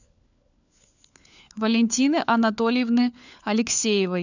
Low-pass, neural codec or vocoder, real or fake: 7.2 kHz; codec, 16 kHz, 4 kbps, FunCodec, trained on LibriTTS, 50 frames a second; fake